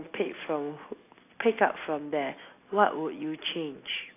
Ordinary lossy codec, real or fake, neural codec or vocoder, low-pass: AAC, 24 kbps; real; none; 3.6 kHz